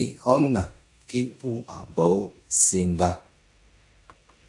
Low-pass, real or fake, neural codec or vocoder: 10.8 kHz; fake; codec, 16 kHz in and 24 kHz out, 0.9 kbps, LongCat-Audio-Codec, four codebook decoder